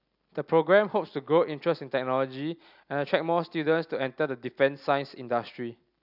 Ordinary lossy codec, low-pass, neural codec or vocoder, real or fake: none; 5.4 kHz; none; real